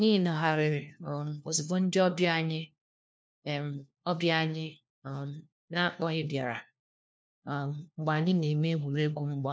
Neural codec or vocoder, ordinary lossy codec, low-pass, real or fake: codec, 16 kHz, 1 kbps, FunCodec, trained on LibriTTS, 50 frames a second; none; none; fake